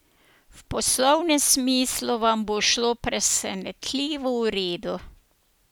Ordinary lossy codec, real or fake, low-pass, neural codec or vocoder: none; real; none; none